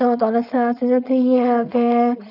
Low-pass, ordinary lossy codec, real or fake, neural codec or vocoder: 5.4 kHz; none; fake; codec, 16 kHz, 4.8 kbps, FACodec